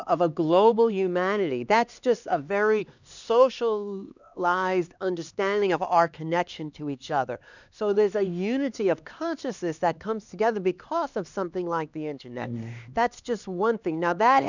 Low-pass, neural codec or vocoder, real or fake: 7.2 kHz; codec, 16 kHz, 2 kbps, X-Codec, HuBERT features, trained on LibriSpeech; fake